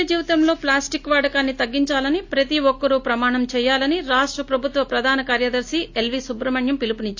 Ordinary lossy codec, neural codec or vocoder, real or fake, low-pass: AAC, 48 kbps; none; real; 7.2 kHz